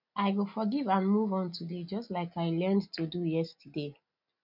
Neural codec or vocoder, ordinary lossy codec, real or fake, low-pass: none; none; real; 5.4 kHz